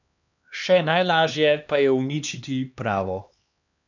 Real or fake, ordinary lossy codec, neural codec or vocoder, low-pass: fake; none; codec, 16 kHz, 2 kbps, X-Codec, HuBERT features, trained on LibriSpeech; 7.2 kHz